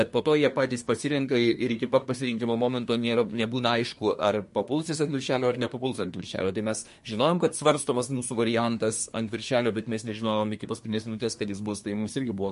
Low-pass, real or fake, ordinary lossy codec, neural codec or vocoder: 10.8 kHz; fake; MP3, 48 kbps; codec, 24 kHz, 1 kbps, SNAC